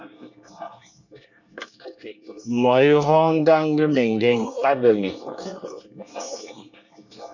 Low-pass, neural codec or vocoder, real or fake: 7.2 kHz; codec, 24 kHz, 1 kbps, SNAC; fake